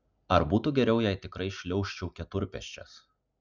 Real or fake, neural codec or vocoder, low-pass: real; none; 7.2 kHz